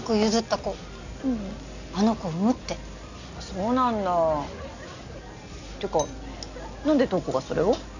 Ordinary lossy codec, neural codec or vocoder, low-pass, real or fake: none; none; 7.2 kHz; real